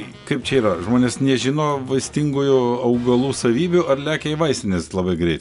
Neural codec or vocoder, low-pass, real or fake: none; 10.8 kHz; real